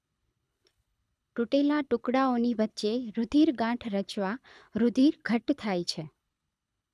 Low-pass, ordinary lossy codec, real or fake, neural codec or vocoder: none; none; fake; codec, 24 kHz, 6 kbps, HILCodec